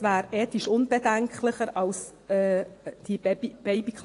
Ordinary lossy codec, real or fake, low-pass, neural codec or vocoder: AAC, 48 kbps; fake; 10.8 kHz; vocoder, 24 kHz, 100 mel bands, Vocos